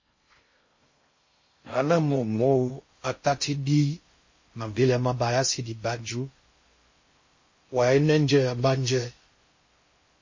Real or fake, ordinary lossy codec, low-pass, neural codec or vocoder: fake; MP3, 32 kbps; 7.2 kHz; codec, 16 kHz in and 24 kHz out, 0.6 kbps, FocalCodec, streaming, 4096 codes